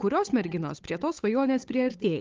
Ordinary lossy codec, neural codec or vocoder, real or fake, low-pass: Opus, 24 kbps; codec, 16 kHz, 8 kbps, FunCodec, trained on Chinese and English, 25 frames a second; fake; 7.2 kHz